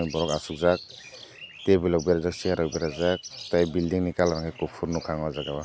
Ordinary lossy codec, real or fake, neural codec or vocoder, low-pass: none; real; none; none